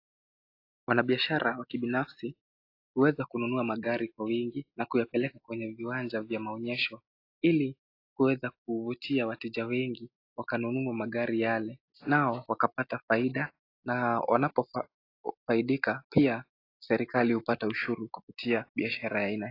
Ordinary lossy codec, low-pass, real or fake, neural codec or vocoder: AAC, 32 kbps; 5.4 kHz; real; none